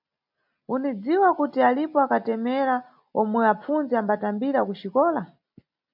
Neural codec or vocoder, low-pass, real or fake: none; 5.4 kHz; real